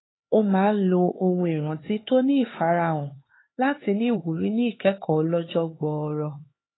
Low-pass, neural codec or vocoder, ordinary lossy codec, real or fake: 7.2 kHz; codec, 16 kHz, 4 kbps, X-Codec, HuBERT features, trained on LibriSpeech; AAC, 16 kbps; fake